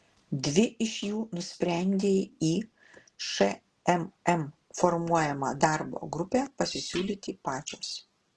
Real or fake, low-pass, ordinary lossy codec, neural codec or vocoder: real; 10.8 kHz; Opus, 16 kbps; none